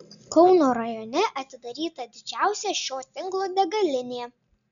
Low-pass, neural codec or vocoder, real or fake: 7.2 kHz; none; real